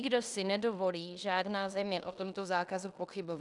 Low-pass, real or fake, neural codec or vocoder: 10.8 kHz; fake; codec, 16 kHz in and 24 kHz out, 0.9 kbps, LongCat-Audio-Codec, fine tuned four codebook decoder